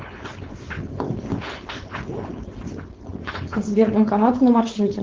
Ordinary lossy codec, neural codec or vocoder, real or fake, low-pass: Opus, 16 kbps; codec, 16 kHz, 4.8 kbps, FACodec; fake; 7.2 kHz